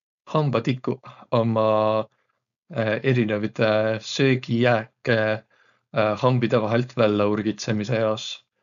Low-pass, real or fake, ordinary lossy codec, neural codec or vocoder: 7.2 kHz; fake; none; codec, 16 kHz, 4.8 kbps, FACodec